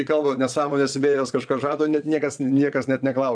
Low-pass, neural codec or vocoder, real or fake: 9.9 kHz; vocoder, 22.05 kHz, 80 mel bands, Vocos; fake